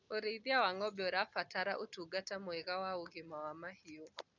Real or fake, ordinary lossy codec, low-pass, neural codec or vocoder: real; none; 7.2 kHz; none